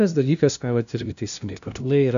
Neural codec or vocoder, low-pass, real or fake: codec, 16 kHz, 0.5 kbps, FunCodec, trained on LibriTTS, 25 frames a second; 7.2 kHz; fake